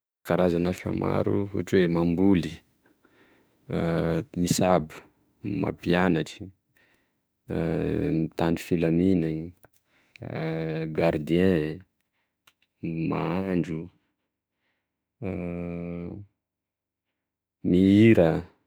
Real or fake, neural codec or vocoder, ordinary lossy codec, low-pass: fake; autoencoder, 48 kHz, 32 numbers a frame, DAC-VAE, trained on Japanese speech; none; none